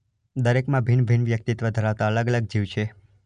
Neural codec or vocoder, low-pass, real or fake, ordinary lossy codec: none; 9.9 kHz; real; none